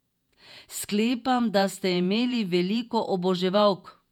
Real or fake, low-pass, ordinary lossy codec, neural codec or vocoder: fake; 19.8 kHz; none; vocoder, 48 kHz, 128 mel bands, Vocos